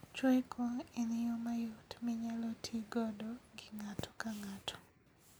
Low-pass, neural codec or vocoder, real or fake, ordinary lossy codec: none; none; real; none